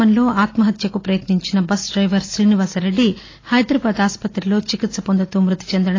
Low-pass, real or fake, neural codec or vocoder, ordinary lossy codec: 7.2 kHz; real; none; AAC, 32 kbps